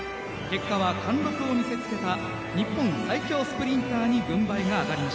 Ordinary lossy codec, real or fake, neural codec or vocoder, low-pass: none; real; none; none